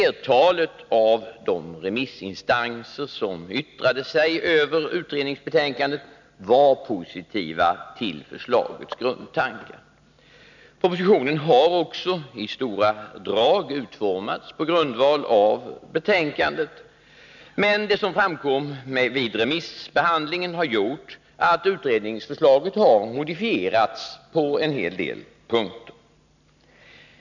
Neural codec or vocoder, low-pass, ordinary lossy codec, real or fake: none; 7.2 kHz; none; real